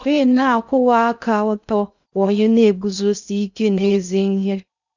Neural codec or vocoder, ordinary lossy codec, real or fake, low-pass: codec, 16 kHz in and 24 kHz out, 0.6 kbps, FocalCodec, streaming, 2048 codes; none; fake; 7.2 kHz